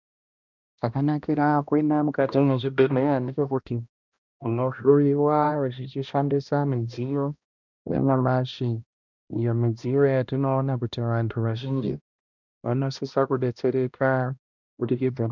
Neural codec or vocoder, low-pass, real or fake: codec, 16 kHz, 1 kbps, X-Codec, HuBERT features, trained on balanced general audio; 7.2 kHz; fake